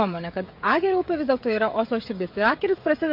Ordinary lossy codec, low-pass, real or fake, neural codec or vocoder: MP3, 32 kbps; 5.4 kHz; fake; codec, 16 kHz, 16 kbps, FreqCodec, smaller model